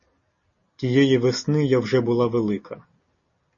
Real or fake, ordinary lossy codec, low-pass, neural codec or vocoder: real; MP3, 32 kbps; 7.2 kHz; none